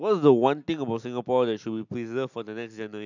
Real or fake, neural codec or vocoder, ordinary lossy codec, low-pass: real; none; none; 7.2 kHz